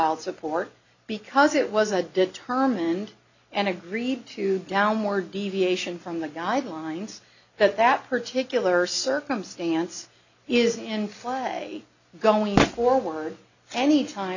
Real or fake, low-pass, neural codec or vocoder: real; 7.2 kHz; none